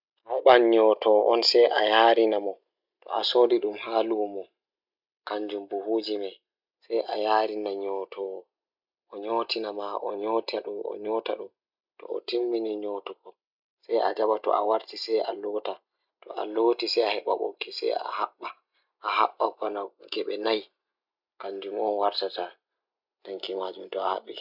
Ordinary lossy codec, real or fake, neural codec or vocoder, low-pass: none; real; none; 5.4 kHz